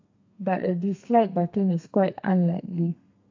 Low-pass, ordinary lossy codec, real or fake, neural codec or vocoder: 7.2 kHz; AAC, 48 kbps; fake; codec, 32 kHz, 1.9 kbps, SNAC